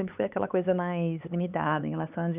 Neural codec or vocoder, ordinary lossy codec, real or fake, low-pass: codec, 16 kHz, 4 kbps, X-Codec, HuBERT features, trained on LibriSpeech; Opus, 64 kbps; fake; 3.6 kHz